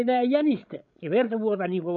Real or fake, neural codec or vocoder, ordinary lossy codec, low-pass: fake; codec, 16 kHz, 16 kbps, FreqCodec, larger model; none; 7.2 kHz